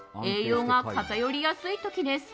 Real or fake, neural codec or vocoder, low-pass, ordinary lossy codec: real; none; none; none